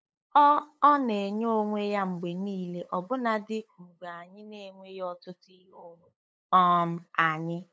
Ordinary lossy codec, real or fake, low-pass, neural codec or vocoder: none; fake; none; codec, 16 kHz, 8 kbps, FunCodec, trained on LibriTTS, 25 frames a second